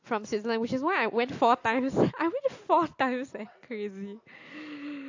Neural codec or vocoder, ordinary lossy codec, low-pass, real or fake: none; AAC, 48 kbps; 7.2 kHz; real